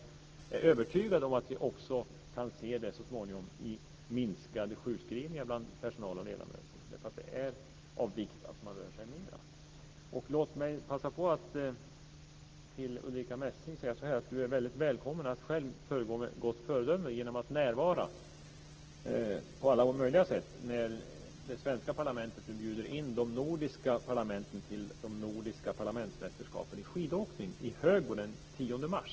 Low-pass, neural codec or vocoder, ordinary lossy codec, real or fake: 7.2 kHz; none; Opus, 16 kbps; real